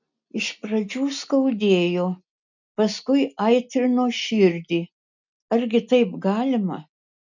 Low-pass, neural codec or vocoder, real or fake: 7.2 kHz; none; real